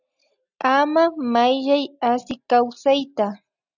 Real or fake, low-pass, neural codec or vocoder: real; 7.2 kHz; none